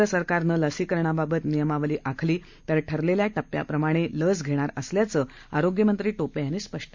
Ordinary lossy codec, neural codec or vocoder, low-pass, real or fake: MP3, 48 kbps; none; 7.2 kHz; real